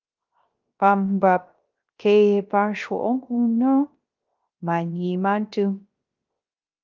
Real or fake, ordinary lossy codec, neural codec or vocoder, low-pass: fake; Opus, 24 kbps; codec, 16 kHz, 0.3 kbps, FocalCodec; 7.2 kHz